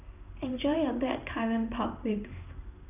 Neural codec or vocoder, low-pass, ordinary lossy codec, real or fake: codec, 16 kHz in and 24 kHz out, 1 kbps, XY-Tokenizer; 3.6 kHz; none; fake